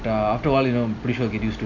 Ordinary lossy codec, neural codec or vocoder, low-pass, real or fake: none; none; 7.2 kHz; real